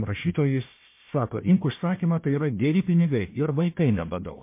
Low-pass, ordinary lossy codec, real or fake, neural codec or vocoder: 3.6 kHz; MP3, 24 kbps; fake; codec, 16 kHz, 1 kbps, FunCodec, trained on Chinese and English, 50 frames a second